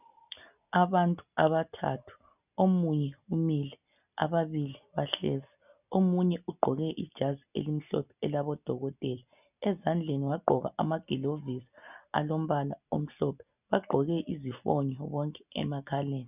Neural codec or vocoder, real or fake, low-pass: none; real; 3.6 kHz